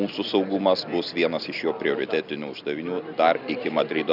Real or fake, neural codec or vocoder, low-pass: real; none; 5.4 kHz